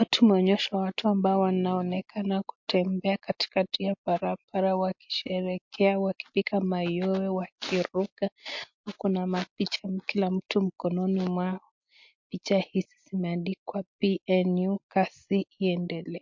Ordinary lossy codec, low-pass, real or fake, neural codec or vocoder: MP3, 48 kbps; 7.2 kHz; real; none